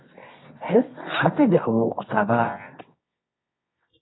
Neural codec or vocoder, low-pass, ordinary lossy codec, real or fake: codec, 24 kHz, 0.9 kbps, WavTokenizer, medium music audio release; 7.2 kHz; AAC, 16 kbps; fake